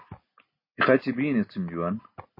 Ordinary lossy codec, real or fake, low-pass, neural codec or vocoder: MP3, 24 kbps; real; 5.4 kHz; none